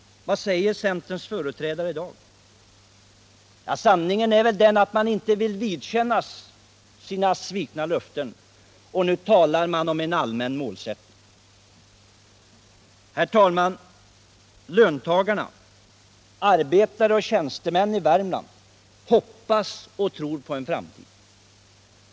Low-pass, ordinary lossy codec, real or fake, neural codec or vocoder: none; none; real; none